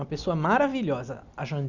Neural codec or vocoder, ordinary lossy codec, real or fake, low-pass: none; none; real; 7.2 kHz